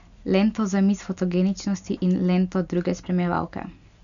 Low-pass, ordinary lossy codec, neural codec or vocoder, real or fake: 7.2 kHz; none; none; real